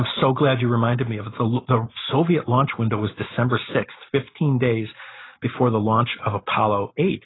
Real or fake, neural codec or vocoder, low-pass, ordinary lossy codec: real; none; 7.2 kHz; AAC, 16 kbps